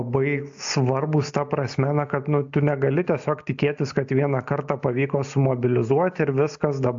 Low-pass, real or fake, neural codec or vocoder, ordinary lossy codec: 7.2 kHz; real; none; AAC, 64 kbps